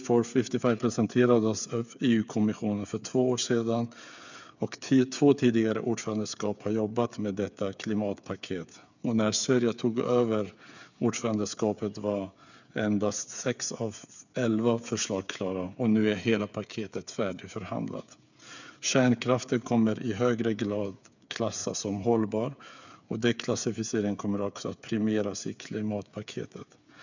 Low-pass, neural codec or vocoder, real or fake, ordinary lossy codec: 7.2 kHz; codec, 16 kHz, 8 kbps, FreqCodec, smaller model; fake; none